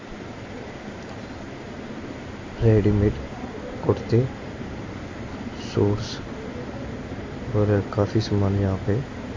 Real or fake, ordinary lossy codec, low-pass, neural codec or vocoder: real; MP3, 32 kbps; 7.2 kHz; none